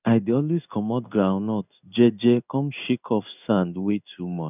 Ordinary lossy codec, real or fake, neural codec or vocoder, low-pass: none; fake; codec, 16 kHz in and 24 kHz out, 1 kbps, XY-Tokenizer; 3.6 kHz